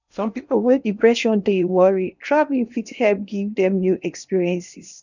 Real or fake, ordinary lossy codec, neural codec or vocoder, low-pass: fake; none; codec, 16 kHz in and 24 kHz out, 0.8 kbps, FocalCodec, streaming, 65536 codes; 7.2 kHz